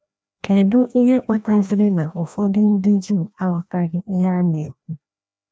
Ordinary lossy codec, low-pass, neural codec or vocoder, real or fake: none; none; codec, 16 kHz, 1 kbps, FreqCodec, larger model; fake